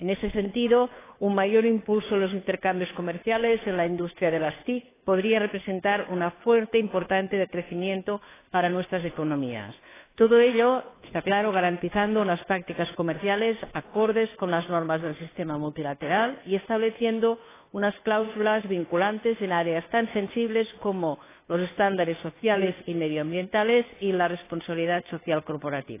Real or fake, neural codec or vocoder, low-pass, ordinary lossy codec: fake; codec, 16 kHz, 2 kbps, FunCodec, trained on Chinese and English, 25 frames a second; 3.6 kHz; AAC, 16 kbps